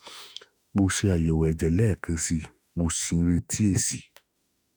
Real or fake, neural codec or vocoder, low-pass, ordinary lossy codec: fake; autoencoder, 48 kHz, 32 numbers a frame, DAC-VAE, trained on Japanese speech; none; none